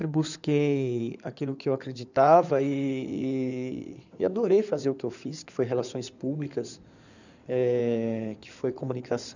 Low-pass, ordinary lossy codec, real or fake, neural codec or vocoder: 7.2 kHz; none; fake; codec, 16 kHz in and 24 kHz out, 2.2 kbps, FireRedTTS-2 codec